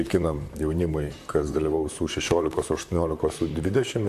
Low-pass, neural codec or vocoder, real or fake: 14.4 kHz; vocoder, 44.1 kHz, 128 mel bands, Pupu-Vocoder; fake